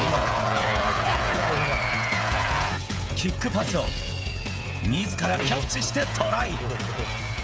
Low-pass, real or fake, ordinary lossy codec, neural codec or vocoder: none; fake; none; codec, 16 kHz, 16 kbps, FreqCodec, smaller model